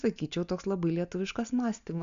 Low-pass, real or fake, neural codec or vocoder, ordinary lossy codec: 7.2 kHz; real; none; AAC, 96 kbps